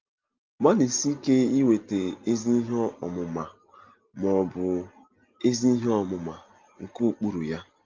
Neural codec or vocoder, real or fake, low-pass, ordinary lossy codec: none; real; 7.2 kHz; Opus, 32 kbps